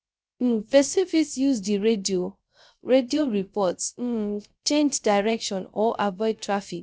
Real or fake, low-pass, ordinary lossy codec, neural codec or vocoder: fake; none; none; codec, 16 kHz, 0.3 kbps, FocalCodec